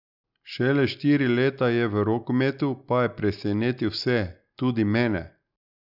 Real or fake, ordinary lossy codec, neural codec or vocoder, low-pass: real; none; none; 5.4 kHz